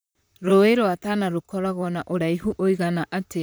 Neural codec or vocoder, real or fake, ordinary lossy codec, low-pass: vocoder, 44.1 kHz, 128 mel bands, Pupu-Vocoder; fake; none; none